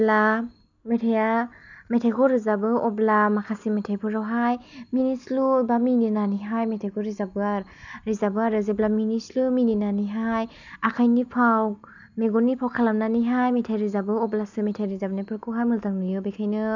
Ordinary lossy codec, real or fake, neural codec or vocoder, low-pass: none; real; none; 7.2 kHz